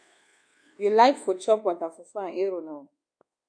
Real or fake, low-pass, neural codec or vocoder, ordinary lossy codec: fake; 9.9 kHz; codec, 24 kHz, 1.2 kbps, DualCodec; AAC, 64 kbps